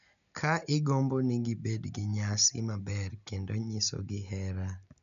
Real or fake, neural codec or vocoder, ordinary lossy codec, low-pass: real; none; none; 7.2 kHz